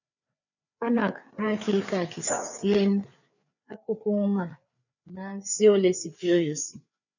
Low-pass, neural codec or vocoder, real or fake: 7.2 kHz; codec, 16 kHz, 4 kbps, FreqCodec, larger model; fake